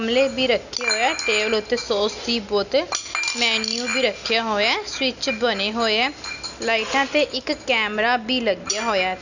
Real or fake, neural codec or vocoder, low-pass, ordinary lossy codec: real; none; 7.2 kHz; none